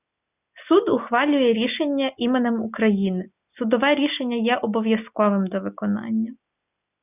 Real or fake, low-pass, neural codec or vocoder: real; 3.6 kHz; none